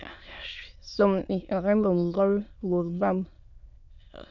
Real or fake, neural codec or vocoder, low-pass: fake; autoencoder, 22.05 kHz, a latent of 192 numbers a frame, VITS, trained on many speakers; 7.2 kHz